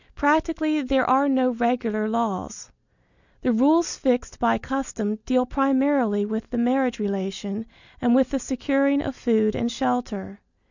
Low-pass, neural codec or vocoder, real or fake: 7.2 kHz; none; real